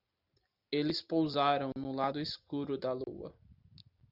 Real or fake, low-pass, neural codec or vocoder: real; 5.4 kHz; none